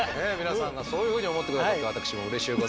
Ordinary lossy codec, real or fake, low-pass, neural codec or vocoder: none; real; none; none